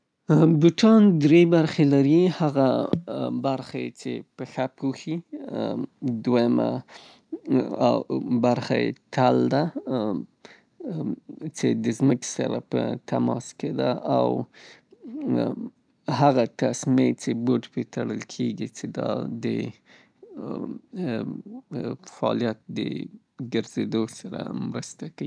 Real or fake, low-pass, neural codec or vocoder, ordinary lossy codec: real; 9.9 kHz; none; none